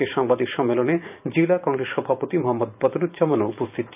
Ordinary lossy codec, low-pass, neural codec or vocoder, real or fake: none; 3.6 kHz; vocoder, 44.1 kHz, 128 mel bands every 512 samples, BigVGAN v2; fake